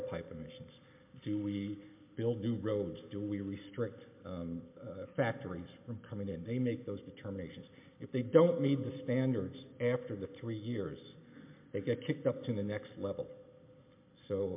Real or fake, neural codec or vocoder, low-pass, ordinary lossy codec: real; none; 3.6 kHz; MP3, 32 kbps